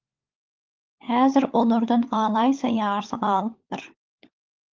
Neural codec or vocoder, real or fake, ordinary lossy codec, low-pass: codec, 16 kHz, 16 kbps, FunCodec, trained on LibriTTS, 50 frames a second; fake; Opus, 32 kbps; 7.2 kHz